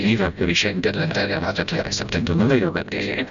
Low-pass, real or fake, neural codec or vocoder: 7.2 kHz; fake; codec, 16 kHz, 0.5 kbps, FreqCodec, smaller model